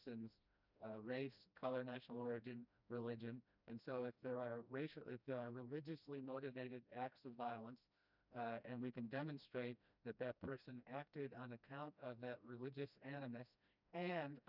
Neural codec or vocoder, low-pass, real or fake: codec, 16 kHz, 1 kbps, FreqCodec, smaller model; 5.4 kHz; fake